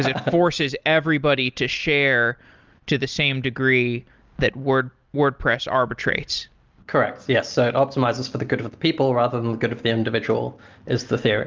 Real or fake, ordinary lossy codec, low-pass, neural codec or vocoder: real; Opus, 32 kbps; 7.2 kHz; none